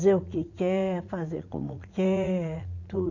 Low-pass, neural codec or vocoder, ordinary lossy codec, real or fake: 7.2 kHz; vocoder, 44.1 kHz, 80 mel bands, Vocos; none; fake